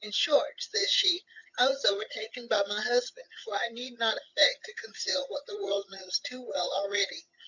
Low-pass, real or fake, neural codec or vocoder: 7.2 kHz; fake; vocoder, 22.05 kHz, 80 mel bands, HiFi-GAN